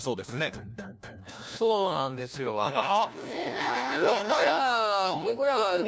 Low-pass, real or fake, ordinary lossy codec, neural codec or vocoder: none; fake; none; codec, 16 kHz, 1 kbps, FunCodec, trained on LibriTTS, 50 frames a second